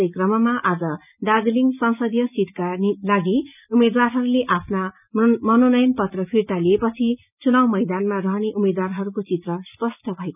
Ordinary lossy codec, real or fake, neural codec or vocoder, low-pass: none; real; none; 3.6 kHz